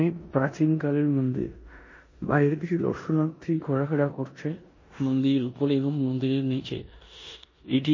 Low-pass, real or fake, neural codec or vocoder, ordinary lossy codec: 7.2 kHz; fake; codec, 16 kHz in and 24 kHz out, 0.9 kbps, LongCat-Audio-Codec, four codebook decoder; MP3, 32 kbps